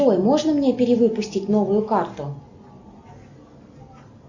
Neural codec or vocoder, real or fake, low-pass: none; real; 7.2 kHz